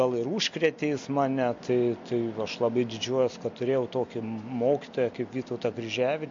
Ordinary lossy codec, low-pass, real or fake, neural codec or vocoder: MP3, 48 kbps; 7.2 kHz; real; none